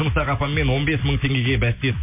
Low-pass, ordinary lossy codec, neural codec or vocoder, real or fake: 3.6 kHz; MP3, 24 kbps; none; real